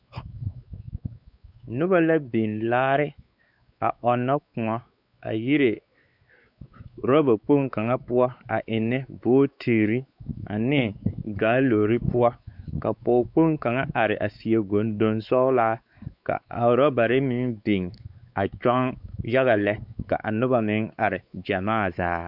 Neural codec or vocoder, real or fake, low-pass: codec, 16 kHz, 4 kbps, X-Codec, WavLM features, trained on Multilingual LibriSpeech; fake; 5.4 kHz